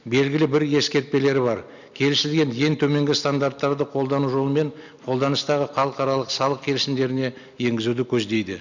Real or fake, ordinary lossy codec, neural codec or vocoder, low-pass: real; none; none; 7.2 kHz